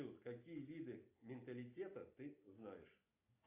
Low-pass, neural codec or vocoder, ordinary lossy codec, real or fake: 3.6 kHz; none; AAC, 24 kbps; real